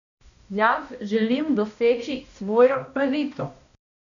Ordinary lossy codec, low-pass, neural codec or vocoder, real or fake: none; 7.2 kHz; codec, 16 kHz, 1 kbps, X-Codec, HuBERT features, trained on balanced general audio; fake